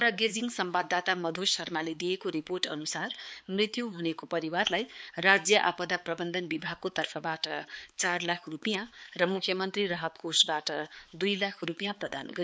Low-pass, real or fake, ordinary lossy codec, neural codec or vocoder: none; fake; none; codec, 16 kHz, 4 kbps, X-Codec, HuBERT features, trained on balanced general audio